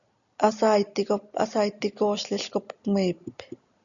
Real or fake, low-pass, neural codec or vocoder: real; 7.2 kHz; none